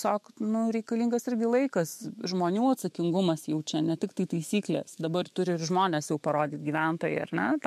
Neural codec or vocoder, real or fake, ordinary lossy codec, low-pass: autoencoder, 48 kHz, 128 numbers a frame, DAC-VAE, trained on Japanese speech; fake; MP3, 64 kbps; 14.4 kHz